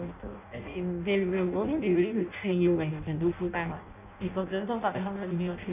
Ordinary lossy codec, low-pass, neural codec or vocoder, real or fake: none; 3.6 kHz; codec, 16 kHz in and 24 kHz out, 0.6 kbps, FireRedTTS-2 codec; fake